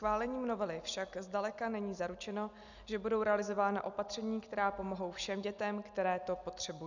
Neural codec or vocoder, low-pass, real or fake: none; 7.2 kHz; real